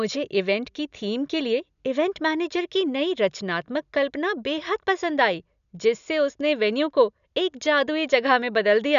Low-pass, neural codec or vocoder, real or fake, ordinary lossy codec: 7.2 kHz; none; real; none